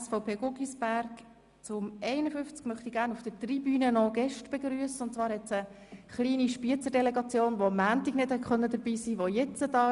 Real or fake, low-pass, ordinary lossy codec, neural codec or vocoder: real; 10.8 kHz; Opus, 64 kbps; none